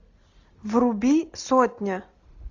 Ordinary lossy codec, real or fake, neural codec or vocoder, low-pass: AAC, 48 kbps; real; none; 7.2 kHz